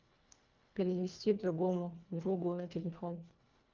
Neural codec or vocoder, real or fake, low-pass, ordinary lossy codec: codec, 24 kHz, 1.5 kbps, HILCodec; fake; 7.2 kHz; Opus, 32 kbps